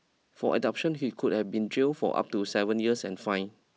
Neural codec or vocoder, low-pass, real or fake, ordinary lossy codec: none; none; real; none